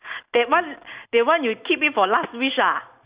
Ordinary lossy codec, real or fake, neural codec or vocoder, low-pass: Opus, 64 kbps; real; none; 3.6 kHz